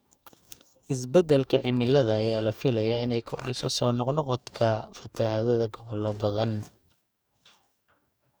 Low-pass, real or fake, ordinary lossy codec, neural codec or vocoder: none; fake; none; codec, 44.1 kHz, 2.6 kbps, DAC